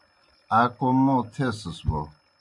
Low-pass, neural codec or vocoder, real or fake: 10.8 kHz; none; real